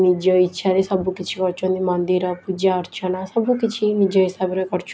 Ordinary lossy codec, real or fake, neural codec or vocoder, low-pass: none; real; none; none